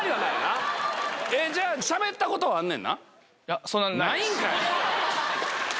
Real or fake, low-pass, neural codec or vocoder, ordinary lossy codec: real; none; none; none